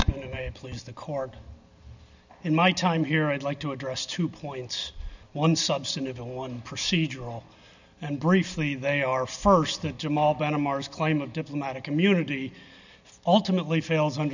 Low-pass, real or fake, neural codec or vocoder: 7.2 kHz; real; none